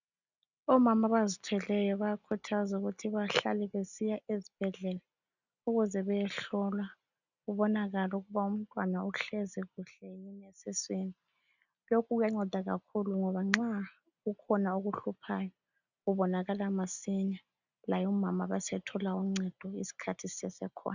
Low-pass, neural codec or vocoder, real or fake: 7.2 kHz; none; real